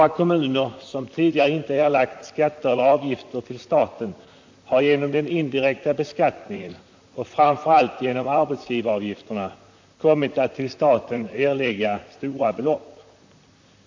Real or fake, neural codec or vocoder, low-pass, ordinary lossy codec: fake; vocoder, 44.1 kHz, 128 mel bands, Pupu-Vocoder; 7.2 kHz; MP3, 64 kbps